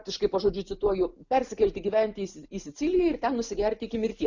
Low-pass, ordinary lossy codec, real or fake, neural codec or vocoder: 7.2 kHz; Opus, 64 kbps; real; none